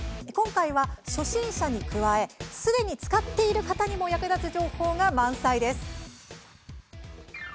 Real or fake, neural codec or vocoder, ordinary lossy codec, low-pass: real; none; none; none